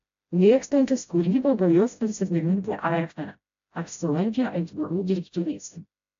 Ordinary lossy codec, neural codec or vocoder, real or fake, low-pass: AAC, 64 kbps; codec, 16 kHz, 0.5 kbps, FreqCodec, smaller model; fake; 7.2 kHz